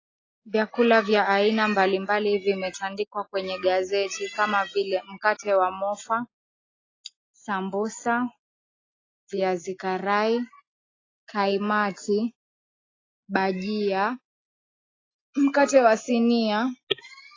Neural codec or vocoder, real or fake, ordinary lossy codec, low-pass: none; real; AAC, 32 kbps; 7.2 kHz